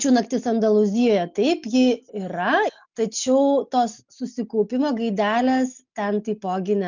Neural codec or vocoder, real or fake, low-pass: none; real; 7.2 kHz